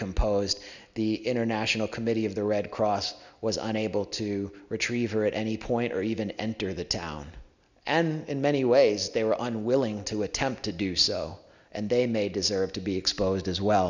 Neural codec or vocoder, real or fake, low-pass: none; real; 7.2 kHz